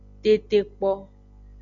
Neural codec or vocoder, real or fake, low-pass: none; real; 7.2 kHz